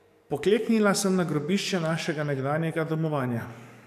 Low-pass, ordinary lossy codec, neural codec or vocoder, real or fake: 14.4 kHz; none; codec, 44.1 kHz, 7.8 kbps, DAC; fake